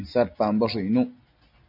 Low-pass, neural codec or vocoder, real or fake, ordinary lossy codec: 5.4 kHz; none; real; AAC, 48 kbps